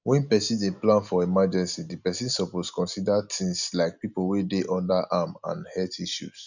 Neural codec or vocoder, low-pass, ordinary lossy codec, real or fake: none; 7.2 kHz; none; real